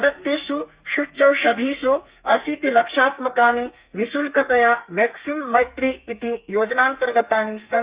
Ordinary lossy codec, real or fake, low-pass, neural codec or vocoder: Opus, 64 kbps; fake; 3.6 kHz; codec, 32 kHz, 1.9 kbps, SNAC